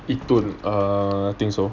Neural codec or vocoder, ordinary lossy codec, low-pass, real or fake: none; none; 7.2 kHz; real